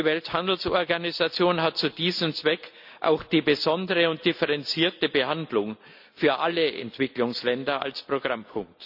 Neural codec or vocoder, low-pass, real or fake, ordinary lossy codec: none; 5.4 kHz; real; none